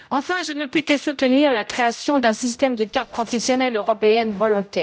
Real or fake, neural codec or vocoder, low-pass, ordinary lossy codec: fake; codec, 16 kHz, 0.5 kbps, X-Codec, HuBERT features, trained on general audio; none; none